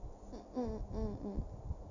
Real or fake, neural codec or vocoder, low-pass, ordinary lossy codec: real; none; 7.2 kHz; none